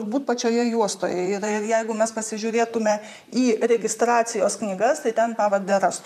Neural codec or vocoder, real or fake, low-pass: vocoder, 44.1 kHz, 128 mel bands, Pupu-Vocoder; fake; 14.4 kHz